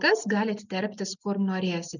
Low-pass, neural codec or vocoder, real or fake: 7.2 kHz; none; real